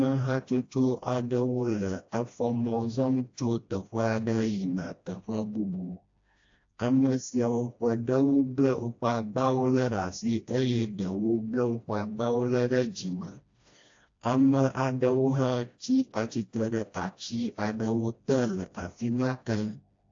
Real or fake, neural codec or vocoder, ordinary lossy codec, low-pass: fake; codec, 16 kHz, 1 kbps, FreqCodec, smaller model; AAC, 48 kbps; 7.2 kHz